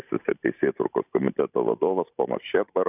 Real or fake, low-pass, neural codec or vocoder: fake; 3.6 kHz; vocoder, 44.1 kHz, 128 mel bands every 512 samples, BigVGAN v2